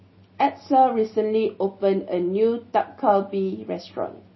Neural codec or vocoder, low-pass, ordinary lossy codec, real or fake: none; 7.2 kHz; MP3, 24 kbps; real